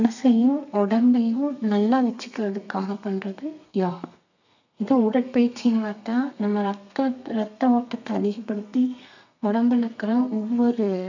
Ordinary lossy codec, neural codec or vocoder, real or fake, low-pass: none; codec, 32 kHz, 1.9 kbps, SNAC; fake; 7.2 kHz